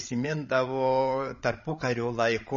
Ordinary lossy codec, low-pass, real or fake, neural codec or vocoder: MP3, 32 kbps; 7.2 kHz; fake; codec, 16 kHz, 16 kbps, FreqCodec, larger model